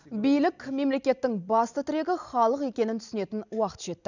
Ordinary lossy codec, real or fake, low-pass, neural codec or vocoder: none; real; 7.2 kHz; none